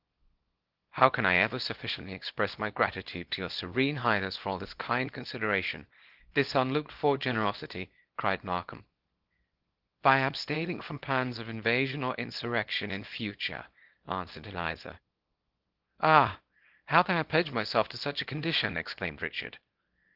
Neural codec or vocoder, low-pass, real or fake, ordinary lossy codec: codec, 24 kHz, 0.9 kbps, WavTokenizer, small release; 5.4 kHz; fake; Opus, 16 kbps